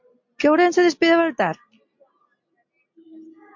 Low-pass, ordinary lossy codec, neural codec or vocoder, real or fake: 7.2 kHz; MP3, 48 kbps; none; real